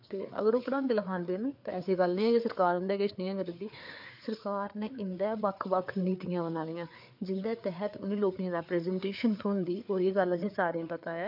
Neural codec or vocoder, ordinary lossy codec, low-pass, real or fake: codec, 16 kHz, 4 kbps, FreqCodec, larger model; MP3, 48 kbps; 5.4 kHz; fake